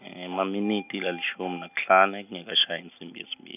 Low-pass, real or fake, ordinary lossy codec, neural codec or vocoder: 3.6 kHz; real; none; none